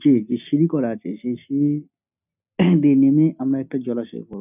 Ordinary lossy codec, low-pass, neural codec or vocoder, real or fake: none; 3.6 kHz; none; real